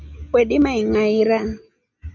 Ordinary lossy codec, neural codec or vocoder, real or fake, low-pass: MP3, 64 kbps; none; real; 7.2 kHz